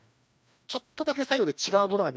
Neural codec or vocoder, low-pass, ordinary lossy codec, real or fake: codec, 16 kHz, 1 kbps, FreqCodec, larger model; none; none; fake